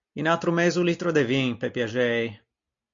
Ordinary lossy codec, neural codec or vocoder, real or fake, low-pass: AAC, 64 kbps; none; real; 7.2 kHz